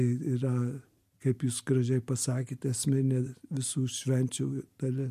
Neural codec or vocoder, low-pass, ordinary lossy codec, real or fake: none; 14.4 kHz; MP3, 64 kbps; real